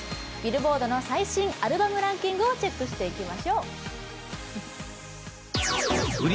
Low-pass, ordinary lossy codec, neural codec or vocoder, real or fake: none; none; none; real